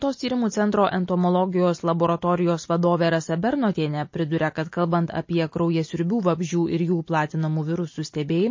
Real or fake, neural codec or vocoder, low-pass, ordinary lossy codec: real; none; 7.2 kHz; MP3, 32 kbps